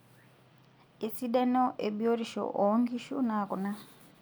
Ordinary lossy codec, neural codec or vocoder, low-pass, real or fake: none; none; none; real